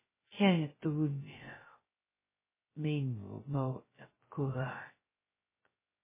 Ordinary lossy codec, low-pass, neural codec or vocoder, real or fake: MP3, 16 kbps; 3.6 kHz; codec, 16 kHz, 0.2 kbps, FocalCodec; fake